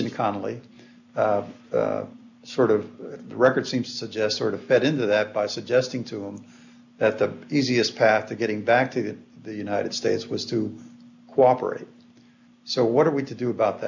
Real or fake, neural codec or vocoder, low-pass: real; none; 7.2 kHz